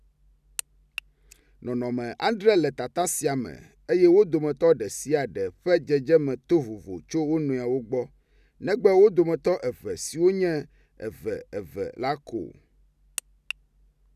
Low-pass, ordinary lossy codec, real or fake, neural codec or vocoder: 14.4 kHz; none; real; none